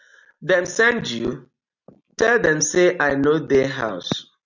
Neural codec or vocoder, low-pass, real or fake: none; 7.2 kHz; real